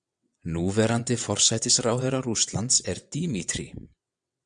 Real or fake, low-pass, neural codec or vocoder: fake; 9.9 kHz; vocoder, 22.05 kHz, 80 mel bands, WaveNeXt